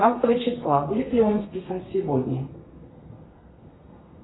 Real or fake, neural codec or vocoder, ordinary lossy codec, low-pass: fake; codec, 32 kHz, 1.9 kbps, SNAC; AAC, 16 kbps; 7.2 kHz